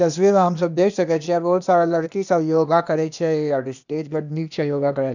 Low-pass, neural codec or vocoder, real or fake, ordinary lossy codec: 7.2 kHz; codec, 16 kHz, 0.8 kbps, ZipCodec; fake; none